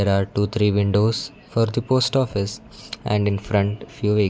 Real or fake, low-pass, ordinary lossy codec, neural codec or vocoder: real; none; none; none